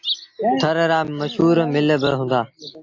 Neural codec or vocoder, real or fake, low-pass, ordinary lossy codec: none; real; 7.2 kHz; AAC, 48 kbps